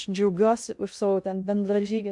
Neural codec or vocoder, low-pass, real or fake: codec, 16 kHz in and 24 kHz out, 0.6 kbps, FocalCodec, streaming, 2048 codes; 10.8 kHz; fake